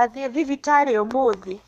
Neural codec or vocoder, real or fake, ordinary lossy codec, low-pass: codec, 32 kHz, 1.9 kbps, SNAC; fake; none; 14.4 kHz